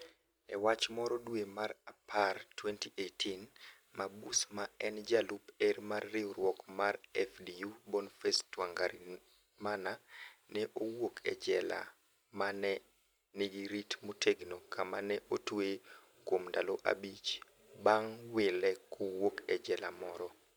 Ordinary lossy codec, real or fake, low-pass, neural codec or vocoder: none; real; none; none